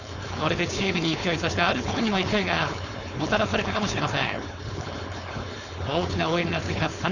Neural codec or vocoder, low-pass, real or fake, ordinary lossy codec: codec, 16 kHz, 4.8 kbps, FACodec; 7.2 kHz; fake; none